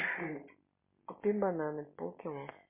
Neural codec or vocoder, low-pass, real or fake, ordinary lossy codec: none; 3.6 kHz; real; MP3, 24 kbps